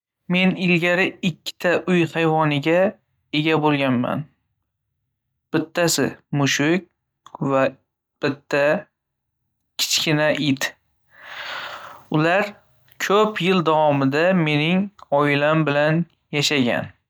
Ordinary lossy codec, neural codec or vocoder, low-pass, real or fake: none; none; none; real